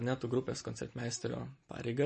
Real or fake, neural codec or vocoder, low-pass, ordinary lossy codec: real; none; 10.8 kHz; MP3, 32 kbps